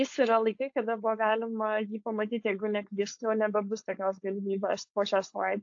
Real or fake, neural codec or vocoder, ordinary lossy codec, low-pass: fake; codec, 16 kHz, 4.8 kbps, FACodec; AAC, 48 kbps; 7.2 kHz